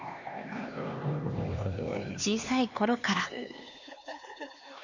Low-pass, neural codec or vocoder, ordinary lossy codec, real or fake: 7.2 kHz; codec, 16 kHz, 2 kbps, X-Codec, HuBERT features, trained on LibriSpeech; none; fake